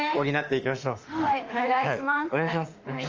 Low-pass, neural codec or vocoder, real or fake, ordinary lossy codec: 7.2 kHz; autoencoder, 48 kHz, 32 numbers a frame, DAC-VAE, trained on Japanese speech; fake; Opus, 24 kbps